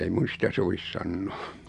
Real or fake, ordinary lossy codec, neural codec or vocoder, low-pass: real; none; none; 10.8 kHz